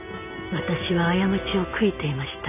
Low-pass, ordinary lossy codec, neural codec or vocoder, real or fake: 3.6 kHz; none; none; real